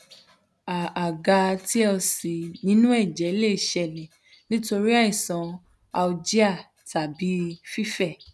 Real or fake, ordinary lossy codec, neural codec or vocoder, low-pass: real; none; none; none